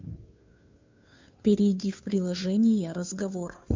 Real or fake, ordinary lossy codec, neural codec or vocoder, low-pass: fake; MP3, 64 kbps; codec, 16 kHz, 2 kbps, FunCodec, trained on Chinese and English, 25 frames a second; 7.2 kHz